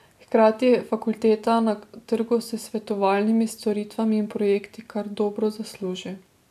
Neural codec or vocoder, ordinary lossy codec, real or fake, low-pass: none; none; real; 14.4 kHz